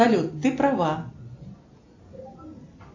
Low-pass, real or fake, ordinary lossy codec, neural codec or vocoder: 7.2 kHz; real; AAC, 48 kbps; none